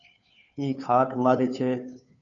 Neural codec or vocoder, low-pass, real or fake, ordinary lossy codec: codec, 16 kHz, 2 kbps, FunCodec, trained on Chinese and English, 25 frames a second; 7.2 kHz; fake; MP3, 96 kbps